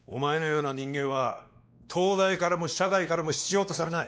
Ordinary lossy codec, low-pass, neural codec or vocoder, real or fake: none; none; codec, 16 kHz, 2 kbps, X-Codec, WavLM features, trained on Multilingual LibriSpeech; fake